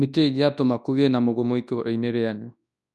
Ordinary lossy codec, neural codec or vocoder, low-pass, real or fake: none; codec, 24 kHz, 0.9 kbps, WavTokenizer, large speech release; none; fake